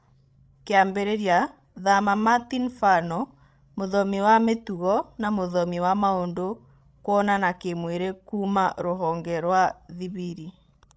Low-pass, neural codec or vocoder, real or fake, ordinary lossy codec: none; codec, 16 kHz, 16 kbps, FreqCodec, larger model; fake; none